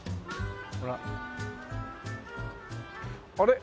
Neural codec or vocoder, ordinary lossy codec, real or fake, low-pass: none; none; real; none